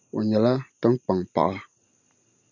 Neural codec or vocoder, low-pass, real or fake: none; 7.2 kHz; real